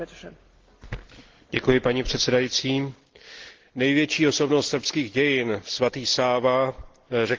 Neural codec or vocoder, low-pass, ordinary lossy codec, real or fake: none; 7.2 kHz; Opus, 16 kbps; real